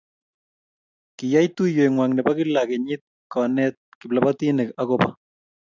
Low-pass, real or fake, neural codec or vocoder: 7.2 kHz; real; none